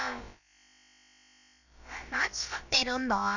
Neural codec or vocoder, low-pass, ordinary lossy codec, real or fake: codec, 16 kHz, about 1 kbps, DyCAST, with the encoder's durations; 7.2 kHz; none; fake